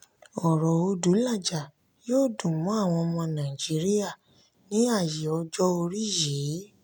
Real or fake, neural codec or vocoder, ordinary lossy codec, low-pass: real; none; none; none